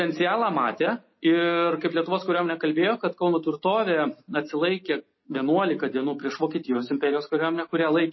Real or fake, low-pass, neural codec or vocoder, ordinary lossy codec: real; 7.2 kHz; none; MP3, 24 kbps